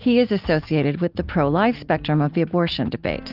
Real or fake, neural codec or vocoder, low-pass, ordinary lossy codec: real; none; 5.4 kHz; Opus, 24 kbps